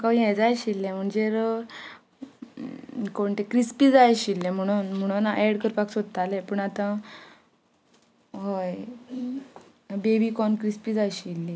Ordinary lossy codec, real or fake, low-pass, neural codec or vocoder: none; real; none; none